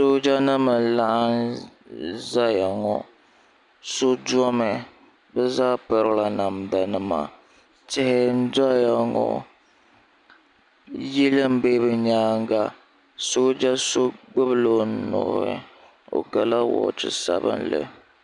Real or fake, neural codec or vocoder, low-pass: real; none; 10.8 kHz